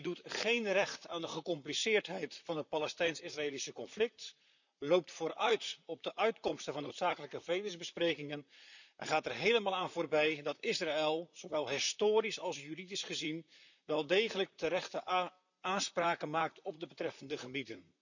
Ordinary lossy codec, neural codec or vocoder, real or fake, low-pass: none; vocoder, 44.1 kHz, 128 mel bands, Pupu-Vocoder; fake; 7.2 kHz